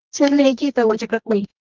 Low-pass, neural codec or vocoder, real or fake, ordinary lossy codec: 7.2 kHz; codec, 24 kHz, 0.9 kbps, WavTokenizer, medium music audio release; fake; Opus, 32 kbps